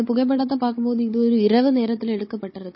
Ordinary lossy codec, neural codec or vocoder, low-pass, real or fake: MP3, 24 kbps; codec, 16 kHz, 16 kbps, FunCodec, trained on Chinese and English, 50 frames a second; 7.2 kHz; fake